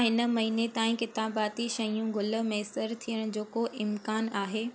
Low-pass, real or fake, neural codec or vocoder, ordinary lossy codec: none; real; none; none